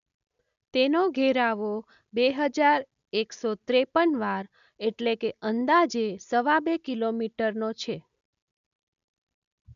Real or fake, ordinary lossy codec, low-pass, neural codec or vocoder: real; none; 7.2 kHz; none